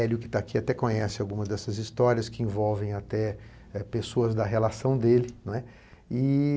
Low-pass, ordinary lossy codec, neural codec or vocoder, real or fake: none; none; none; real